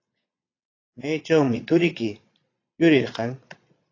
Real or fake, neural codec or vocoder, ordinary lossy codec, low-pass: fake; vocoder, 22.05 kHz, 80 mel bands, Vocos; AAC, 32 kbps; 7.2 kHz